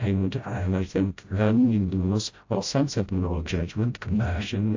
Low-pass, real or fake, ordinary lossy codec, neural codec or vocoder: 7.2 kHz; fake; AAC, 48 kbps; codec, 16 kHz, 0.5 kbps, FreqCodec, smaller model